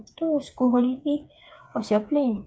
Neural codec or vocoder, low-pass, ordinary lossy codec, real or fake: codec, 16 kHz, 4 kbps, FreqCodec, smaller model; none; none; fake